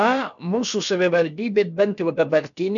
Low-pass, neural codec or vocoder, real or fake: 7.2 kHz; codec, 16 kHz, about 1 kbps, DyCAST, with the encoder's durations; fake